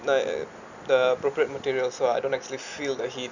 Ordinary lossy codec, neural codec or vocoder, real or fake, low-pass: none; none; real; 7.2 kHz